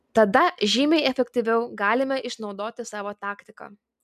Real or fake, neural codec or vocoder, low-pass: real; none; 14.4 kHz